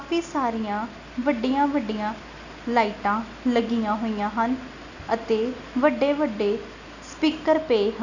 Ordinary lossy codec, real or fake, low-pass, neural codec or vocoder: none; real; 7.2 kHz; none